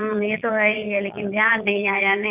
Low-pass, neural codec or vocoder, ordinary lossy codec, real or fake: 3.6 kHz; vocoder, 22.05 kHz, 80 mel bands, Vocos; none; fake